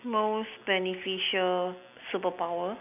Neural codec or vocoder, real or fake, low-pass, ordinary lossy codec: none; real; 3.6 kHz; none